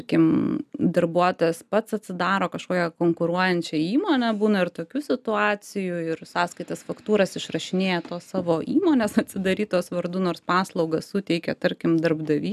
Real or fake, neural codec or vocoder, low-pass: real; none; 14.4 kHz